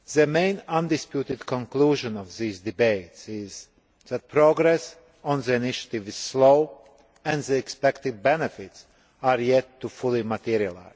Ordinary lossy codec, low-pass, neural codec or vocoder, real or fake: none; none; none; real